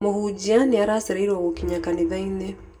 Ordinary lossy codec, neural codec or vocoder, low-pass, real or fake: none; none; 19.8 kHz; real